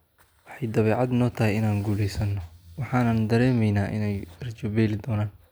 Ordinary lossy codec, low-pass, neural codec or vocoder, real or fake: none; none; none; real